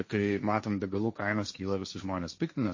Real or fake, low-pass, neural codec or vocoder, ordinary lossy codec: fake; 7.2 kHz; codec, 16 kHz, 1.1 kbps, Voila-Tokenizer; MP3, 32 kbps